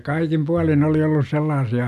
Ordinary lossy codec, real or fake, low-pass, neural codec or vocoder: none; real; 14.4 kHz; none